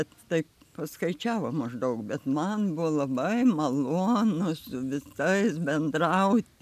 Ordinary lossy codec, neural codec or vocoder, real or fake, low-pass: AAC, 96 kbps; none; real; 14.4 kHz